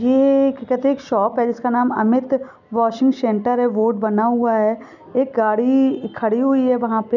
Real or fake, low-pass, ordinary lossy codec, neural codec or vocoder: real; 7.2 kHz; none; none